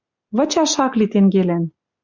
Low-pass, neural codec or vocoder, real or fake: 7.2 kHz; none; real